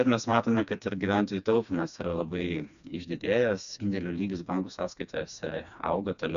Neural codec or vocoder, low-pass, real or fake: codec, 16 kHz, 2 kbps, FreqCodec, smaller model; 7.2 kHz; fake